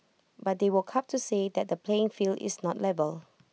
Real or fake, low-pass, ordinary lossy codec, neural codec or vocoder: real; none; none; none